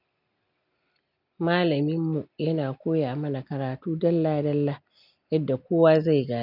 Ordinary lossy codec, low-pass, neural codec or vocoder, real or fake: none; 5.4 kHz; none; real